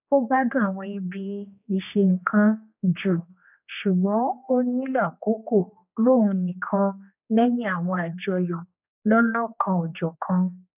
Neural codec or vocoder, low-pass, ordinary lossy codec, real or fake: codec, 16 kHz, 2 kbps, X-Codec, HuBERT features, trained on general audio; 3.6 kHz; none; fake